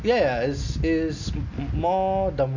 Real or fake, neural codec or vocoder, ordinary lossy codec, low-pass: real; none; none; 7.2 kHz